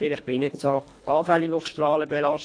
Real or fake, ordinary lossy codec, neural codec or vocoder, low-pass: fake; AAC, 48 kbps; codec, 24 kHz, 1.5 kbps, HILCodec; 9.9 kHz